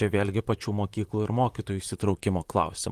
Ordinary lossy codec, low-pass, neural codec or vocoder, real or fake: Opus, 24 kbps; 14.4 kHz; vocoder, 44.1 kHz, 128 mel bands, Pupu-Vocoder; fake